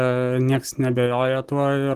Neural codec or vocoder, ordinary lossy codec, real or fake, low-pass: none; Opus, 16 kbps; real; 14.4 kHz